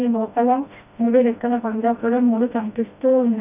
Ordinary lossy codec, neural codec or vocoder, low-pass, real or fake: none; codec, 16 kHz, 1 kbps, FreqCodec, smaller model; 3.6 kHz; fake